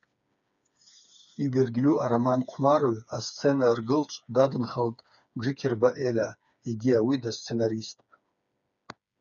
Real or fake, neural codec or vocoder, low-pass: fake; codec, 16 kHz, 4 kbps, FreqCodec, smaller model; 7.2 kHz